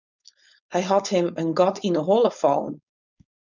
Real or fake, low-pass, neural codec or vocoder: fake; 7.2 kHz; codec, 16 kHz, 4.8 kbps, FACodec